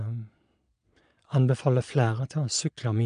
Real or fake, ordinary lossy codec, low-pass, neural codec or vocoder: fake; none; 9.9 kHz; vocoder, 22.05 kHz, 80 mel bands, Vocos